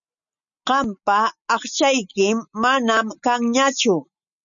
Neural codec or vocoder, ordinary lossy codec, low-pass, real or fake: none; MP3, 96 kbps; 7.2 kHz; real